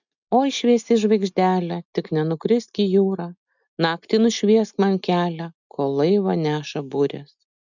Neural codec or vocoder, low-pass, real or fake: none; 7.2 kHz; real